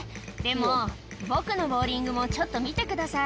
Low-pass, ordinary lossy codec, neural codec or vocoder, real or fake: none; none; none; real